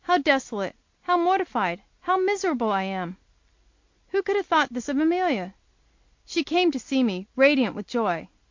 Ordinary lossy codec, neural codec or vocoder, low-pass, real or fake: MP3, 48 kbps; none; 7.2 kHz; real